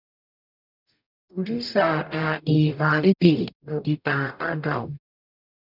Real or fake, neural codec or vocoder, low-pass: fake; codec, 44.1 kHz, 0.9 kbps, DAC; 5.4 kHz